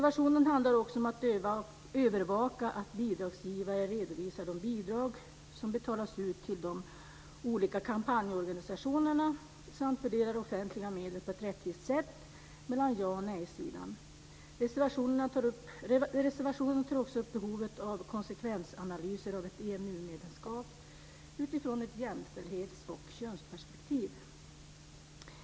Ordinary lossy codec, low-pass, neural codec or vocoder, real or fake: none; none; none; real